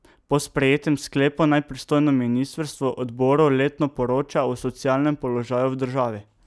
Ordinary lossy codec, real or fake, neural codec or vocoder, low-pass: none; real; none; none